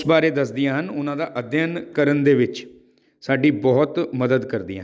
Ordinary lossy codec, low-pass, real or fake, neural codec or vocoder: none; none; real; none